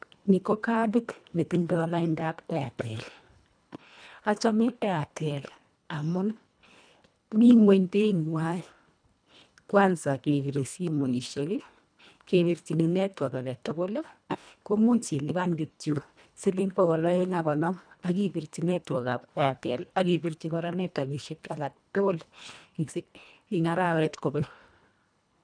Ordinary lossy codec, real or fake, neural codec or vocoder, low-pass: none; fake; codec, 24 kHz, 1.5 kbps, HILCodec; 9.9 kHz